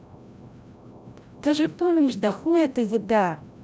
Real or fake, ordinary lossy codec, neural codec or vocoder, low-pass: fake; none; codec, 16 kHz, 0.5 kbps, FreqCodec, larger model; none